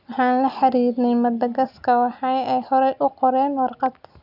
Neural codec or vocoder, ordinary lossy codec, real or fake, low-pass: none; none; real; 5.4 kHz